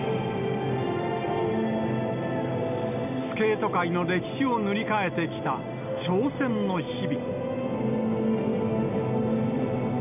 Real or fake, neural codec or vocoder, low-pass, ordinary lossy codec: real; none; 3.6 kHz; none